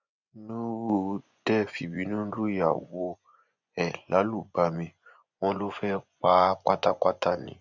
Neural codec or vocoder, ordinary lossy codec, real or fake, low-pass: vocoder, 24 kHz, 100 mel bands, Vocos; none; fake; 7.2 kHz